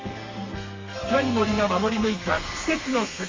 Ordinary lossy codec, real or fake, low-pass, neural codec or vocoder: Opus, 32 kbps; fake; 7.2 kHz; codec, 32 kHz, 1.9 kbps, SNAC